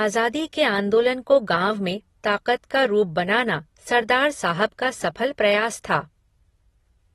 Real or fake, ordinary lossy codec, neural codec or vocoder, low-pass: real; AAC, 32 kbps; none; 19.8 kHz